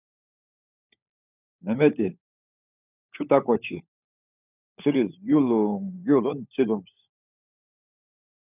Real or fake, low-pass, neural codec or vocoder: fake; 3.6 kHz; codec, 16 kHz, 16 kbps, FunCodec, trained on LibriTTS, 50 frames a second